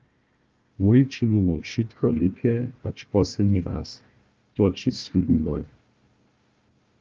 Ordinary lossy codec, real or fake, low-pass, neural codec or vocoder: Opus, 24 kbps; fake; 7.2 kHz; codec, 16 kHz, 1 kbps, FunCodec, trained on Chinese and English, 50 frames a second